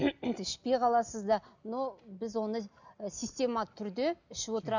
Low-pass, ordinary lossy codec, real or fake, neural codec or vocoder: 7.2 kHz; none; real; none